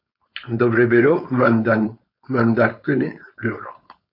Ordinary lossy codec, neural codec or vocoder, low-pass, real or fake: MP3, 32 kbps; codec, 16 kHz, 4.8 kbps, FACodec; 5.4 kHz; fake